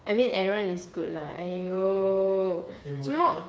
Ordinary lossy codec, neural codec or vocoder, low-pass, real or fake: none; codec, 16 kHz, 4 kbps, FreqCodec, smaller model; none; fake